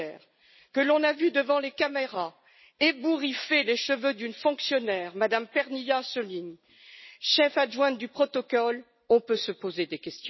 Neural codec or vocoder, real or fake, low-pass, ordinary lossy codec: none; real; 7.2 kHz; MP3, 24 kbps